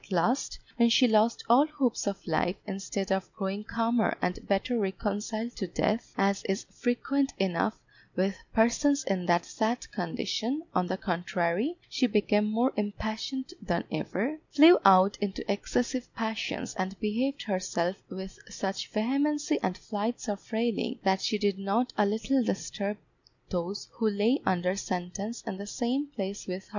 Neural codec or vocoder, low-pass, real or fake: none; 7.2 kHz; real